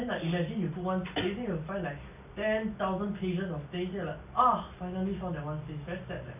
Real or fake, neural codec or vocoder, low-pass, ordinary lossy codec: real; none; 3.6 kHz; none